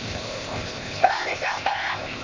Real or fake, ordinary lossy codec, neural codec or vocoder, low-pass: fake; MP3, 64 kbps; codec, 16 kHz, 0.8 kbps, ZipCodec; 7.2 kHz